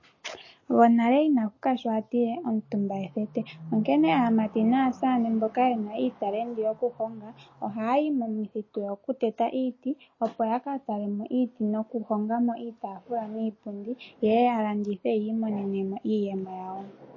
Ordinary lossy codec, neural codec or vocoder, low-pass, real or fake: MP3, 32 kbps; none; 7.2 kHz; real